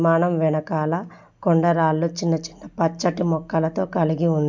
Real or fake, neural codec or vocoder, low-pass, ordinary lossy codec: real; none; 7.2 kHz; MP3, 64 kbps